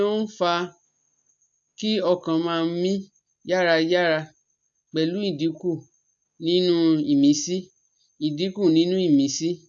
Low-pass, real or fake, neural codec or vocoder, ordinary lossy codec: 7.2 kHz; real; none; AAC, 64 kbps